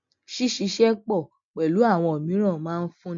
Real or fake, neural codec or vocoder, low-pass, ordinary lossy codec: real; none; 7.2 kHz; MP3, 48 kbps